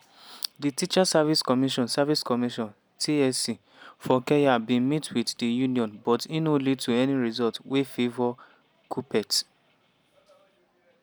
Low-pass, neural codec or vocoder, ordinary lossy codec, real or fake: none; none; none; real